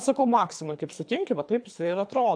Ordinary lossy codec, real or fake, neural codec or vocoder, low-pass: AAC, 64 kbps; fake; codec, 24 kHz, 3 kbps, HILCodec; 9.9 kHz